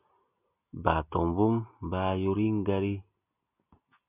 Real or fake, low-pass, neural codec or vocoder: real; 3.6 kHz; none